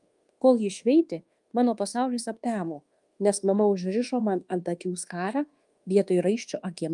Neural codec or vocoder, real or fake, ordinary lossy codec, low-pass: codec, 24 kHz, 1.2 kbps, DualCodec; fake; Opus, 32 kbps; 10.8 kHz